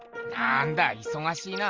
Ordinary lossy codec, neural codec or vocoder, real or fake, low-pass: Opus, 32 kbps; none; real; 7.2 kHz